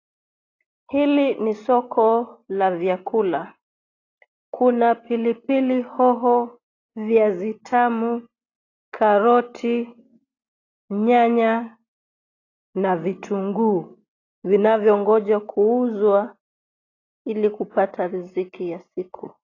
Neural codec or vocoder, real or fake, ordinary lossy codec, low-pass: none; real; AAC, 32 kbps; 7.2 kHz